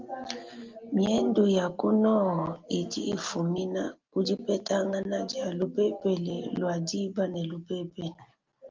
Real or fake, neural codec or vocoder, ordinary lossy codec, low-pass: real; none; Opus, 24 kbps; 7.2 kHz